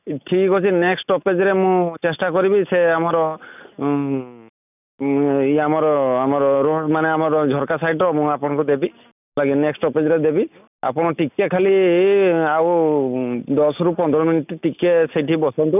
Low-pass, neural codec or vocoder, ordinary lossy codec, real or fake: 3.6 kHz; none; none; real